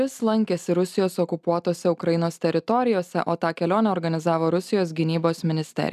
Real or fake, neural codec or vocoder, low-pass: real; none; 14.4 kHz